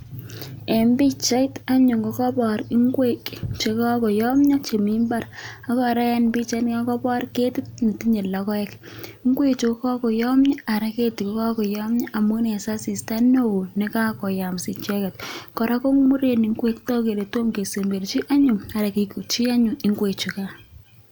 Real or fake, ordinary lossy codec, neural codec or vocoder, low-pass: real; none; none; none